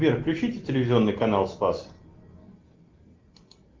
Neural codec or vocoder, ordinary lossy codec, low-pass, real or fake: none; Opus, 24 kbps; 7.2 kHz; real